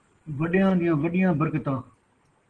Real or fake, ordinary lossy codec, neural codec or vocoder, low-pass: real; Opus, 16 kbps; none; 9.9 kHz